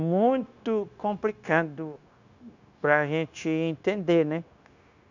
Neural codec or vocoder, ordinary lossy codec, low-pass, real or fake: codec, 16 kHz, 0.9 kbps, LongCat-Audio-Codec; none; 7.2 kHz; fake